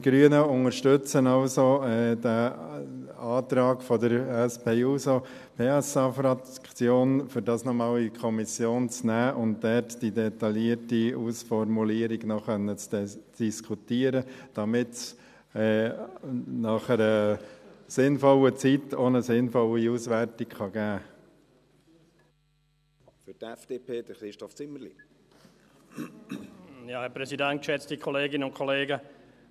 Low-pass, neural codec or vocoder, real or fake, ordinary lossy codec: 14.4 kHz; none; real; none